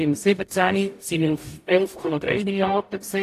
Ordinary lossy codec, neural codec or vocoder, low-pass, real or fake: AAC, 64 kbps; codec, 44.1 kHz, 0.9 kbps, DAC; 14.4 kHz; fake